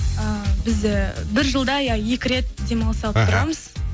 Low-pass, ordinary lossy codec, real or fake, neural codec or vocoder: none; none; real; none